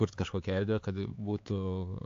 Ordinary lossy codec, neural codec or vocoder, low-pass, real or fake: AAC, 48 kbps; codec, 16 kHz, 4 kbps, X-Codec, HuBERT features, trained on LibriSpeech; 7.2 kHz; fake